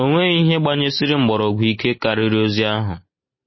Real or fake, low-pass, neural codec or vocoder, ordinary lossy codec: real; 7.2 kHz; none; MP3, 24 kbps